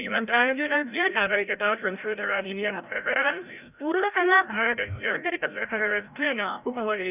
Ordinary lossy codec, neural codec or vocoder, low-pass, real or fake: none; codec, 16 kHz, 0.5 kbps, FreqCodec, larger model; 3.6 kHz; fake